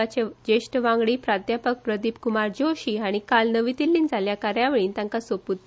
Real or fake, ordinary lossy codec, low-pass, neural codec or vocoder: real; none; none; none